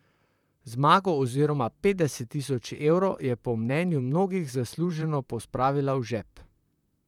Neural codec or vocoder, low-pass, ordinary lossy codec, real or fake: vocoder, 44.1 kHz, 128 mel bands, Pupu-Vocoder; 19.8 kHz; none; fake